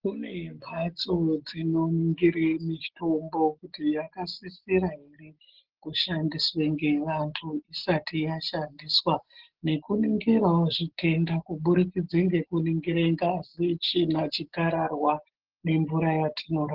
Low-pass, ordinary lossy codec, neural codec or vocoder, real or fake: 5.4 kHz; Opus, 16 kbps; none; real